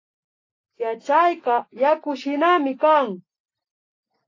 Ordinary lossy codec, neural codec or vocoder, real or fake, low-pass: AAC, 32 kbps; none; real; 7.2 kHz